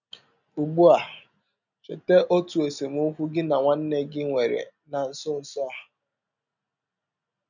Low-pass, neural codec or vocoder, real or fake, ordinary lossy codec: 7.2 kHz; none; real; none